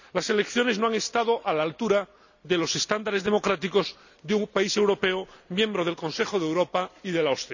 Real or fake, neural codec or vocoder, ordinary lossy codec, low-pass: real; none; none; 7.2 kHz